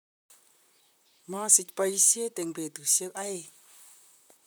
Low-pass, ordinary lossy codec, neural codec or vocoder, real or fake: none; none; vocoder, 44.1 kHz, 128 mel bands, Pupu-Vocoder; fake